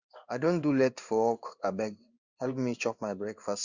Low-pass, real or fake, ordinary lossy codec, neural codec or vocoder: 7.2 kHz; fake; Opus, 64 kbps; codec, 16 kHz in and 24 kHz out, 1 kbps, XY-Tokenizer